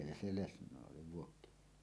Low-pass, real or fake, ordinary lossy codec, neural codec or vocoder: none; real; none; none